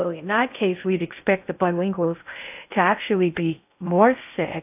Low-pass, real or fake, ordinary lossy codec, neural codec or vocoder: 3.6 kHz; fake; AAC, 32 kbps; codec, 16 kHz in and 24 kHz out, 0.6 kbps, FocalCodec, streaming, 2048 codes